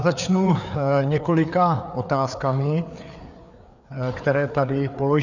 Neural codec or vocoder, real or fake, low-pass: codec, 16 kHz, 4 kbps, FreqCodec, larger model; fake; 7.2 kHz